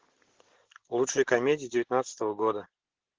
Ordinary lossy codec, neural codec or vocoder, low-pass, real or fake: Opus, 16 kbps; none; 7.2 kHz; real